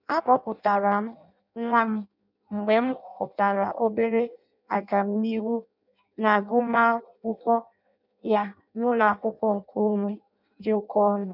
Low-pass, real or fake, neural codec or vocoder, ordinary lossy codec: 5.4 kHz; fake; codec, 16 kHz in and 24 kHz out, 0.6 kbps, FireRedTTS-2 codec; none